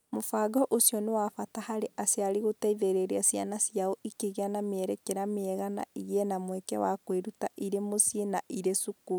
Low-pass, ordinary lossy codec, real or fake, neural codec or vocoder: none; none; real; none